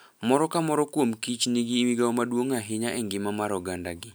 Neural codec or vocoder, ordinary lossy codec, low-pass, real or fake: none; none; none; real